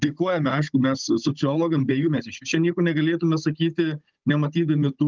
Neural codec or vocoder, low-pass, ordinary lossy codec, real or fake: codec, 16 kHz, 16 kbps, FunCodec, trained on Chinese and English, 50 frames a second; 7.2 kHz; Opus, 24 kbps; fake